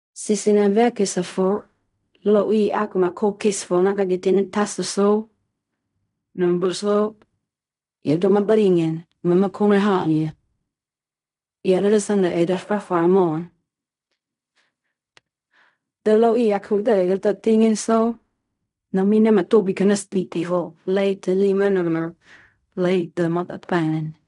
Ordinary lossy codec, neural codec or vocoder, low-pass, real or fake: none; codec, 16 kHz in and 24 kHz out, 0.4 kbps, LongCat-Audio-Codec, fine tuned four codebook decoder; 10.8 kHz; fake